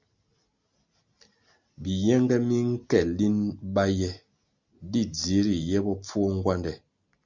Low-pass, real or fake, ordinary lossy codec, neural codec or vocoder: 7.2 kHz; real; Opus, 64 kbps; none